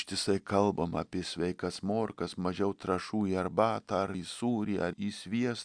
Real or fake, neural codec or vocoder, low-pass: real; none; 9.9 kHz